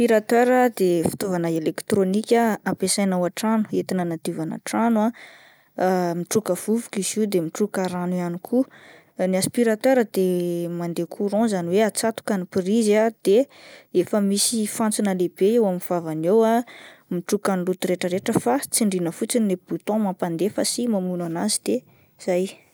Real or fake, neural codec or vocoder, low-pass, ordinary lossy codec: real; none; none; none